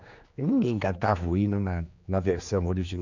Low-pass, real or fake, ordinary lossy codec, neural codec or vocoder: 7.2 kHz; fake; none; codec, 16 kHz, 2 kbps, X-Codec, HuBERT features, trained on general audio